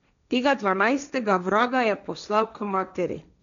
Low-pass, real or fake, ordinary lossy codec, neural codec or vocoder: 7.2 kHz; fake; none; codec, 16 kHz, 1.1 kbps, Voila-Tokenizer